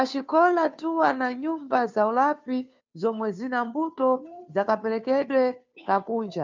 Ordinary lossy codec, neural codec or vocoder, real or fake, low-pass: MP3, 64 kbps; codec, 16 kHz, 2 kbps, FunCodec, trained on Chinese and English, 25 frames a second; fake; 7.2 kHz